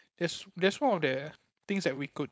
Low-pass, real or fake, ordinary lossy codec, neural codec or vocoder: none; fake; none; codec, 16 kHz, 4.8 kbps, FACodec